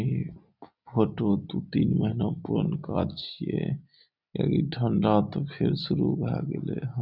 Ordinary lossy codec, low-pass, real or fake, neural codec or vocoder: none; 5.4 kHz; real; none